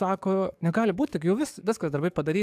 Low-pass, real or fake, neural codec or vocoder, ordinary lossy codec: 14.4 kHz; fake; codec, 44.1 kHz, 7.8 kbps, DAC; AAC, 96 kbps